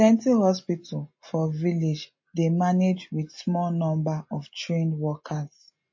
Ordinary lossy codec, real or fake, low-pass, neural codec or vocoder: MP3, 32 kbps; real; 7.2 kHz; none